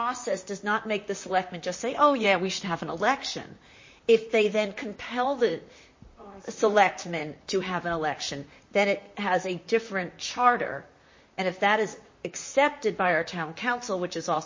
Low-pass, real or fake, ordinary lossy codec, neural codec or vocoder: 7.2 kHz; fake; MP3, 32 kbps; vocoder, 44.1 kHz, 128 mel bands, Pupu-Vocoder